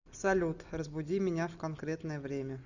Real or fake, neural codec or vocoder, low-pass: real; none; 7.2 kHz